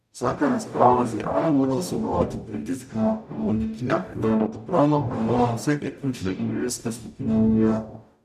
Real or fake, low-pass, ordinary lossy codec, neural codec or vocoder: fake; 14.4 kHz; none; codec, 44.1 kHz, 0.9 kbps, DAC